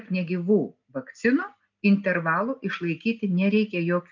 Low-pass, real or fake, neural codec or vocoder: 7.2 kHz; real; none